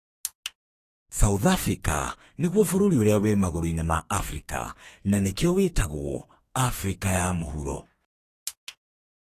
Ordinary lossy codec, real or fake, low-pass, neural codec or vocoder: AAC, 48 kbps; fake; 14.4 kHz; codec, 32 kHz, 1.9 kbps, SNAC